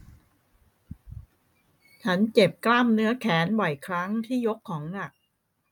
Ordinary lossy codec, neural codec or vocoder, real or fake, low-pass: none; none; real; 19.8 kHz